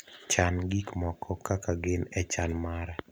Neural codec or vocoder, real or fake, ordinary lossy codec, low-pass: none; real; none; none